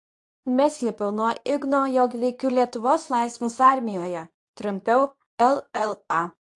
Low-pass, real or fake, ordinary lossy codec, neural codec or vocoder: 10.8 kHz; fake; AAC, 64 kbps; codec, 24 kHz, 0.9 kbps, WavTokenizer, medium speech release version 2